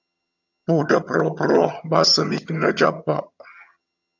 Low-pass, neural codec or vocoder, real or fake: 7.2 kHz; vocoder, 22.05 kHz, 80 mel bands, HiFi-GAN; fake